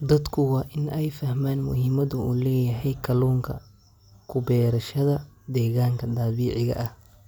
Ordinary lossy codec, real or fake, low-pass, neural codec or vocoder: none; real; 19.8 kHz; none